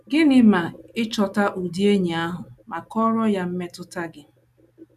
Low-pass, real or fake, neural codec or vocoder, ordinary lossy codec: 14.4 kHz; real; none; none